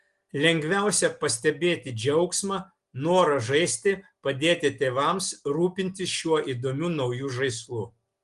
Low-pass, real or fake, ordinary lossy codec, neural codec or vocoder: 10.8 kHz; real; Opus, 24 kbps; none